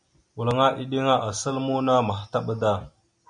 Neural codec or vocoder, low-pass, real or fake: none; 9.9 kHz; real